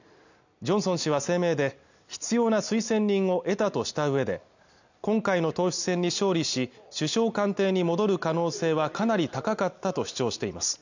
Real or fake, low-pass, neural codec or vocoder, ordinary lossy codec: real; 7.2 kHz; none; none